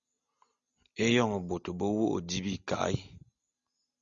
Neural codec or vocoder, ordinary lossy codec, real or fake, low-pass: none; Opus, 64 kbps; real; 7.2 kHz